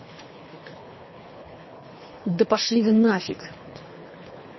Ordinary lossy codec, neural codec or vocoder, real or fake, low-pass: MP3, 24 kbps; codec, 24 kHz, 3 kbps, HILCodec; fake; 7.2 kHz